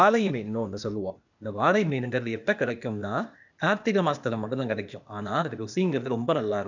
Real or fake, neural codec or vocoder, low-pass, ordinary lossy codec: fake; codec, 16 kHz, 0.8 kbps, ZipCodec; 7.2 kHz; none